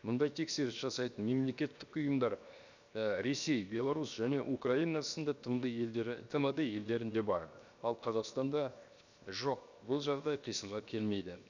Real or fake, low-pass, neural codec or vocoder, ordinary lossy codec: fake; 7.2 kHz; codec, 16 kHz, 0.7 kbps, FocalCodec; none